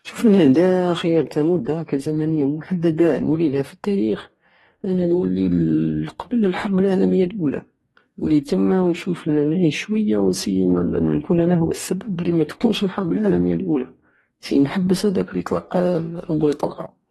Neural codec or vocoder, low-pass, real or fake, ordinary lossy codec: codec, 44.1 kHz, 2.6 kbps, DAC; 19.8 kHz; fake; AAC, 32 kbps